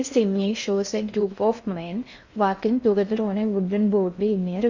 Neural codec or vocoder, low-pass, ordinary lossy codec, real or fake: codec, 16 kHz in and 24 kHz out, 0.6 kbps, FocalCodec, streaming, 4096 codes; 7.2 kHz; Opus, 64 kbps; fake